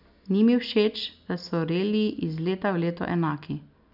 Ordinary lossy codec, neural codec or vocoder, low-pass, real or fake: none; none; 5.4 kHz; real